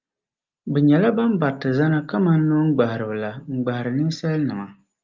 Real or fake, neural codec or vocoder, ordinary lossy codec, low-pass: real; none; Opus, 24 kbps; 7.2 kHz